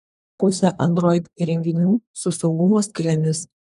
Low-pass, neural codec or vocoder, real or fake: 10.8 kHz; codec, 24 kHz, 1 kbps, SNAC; fake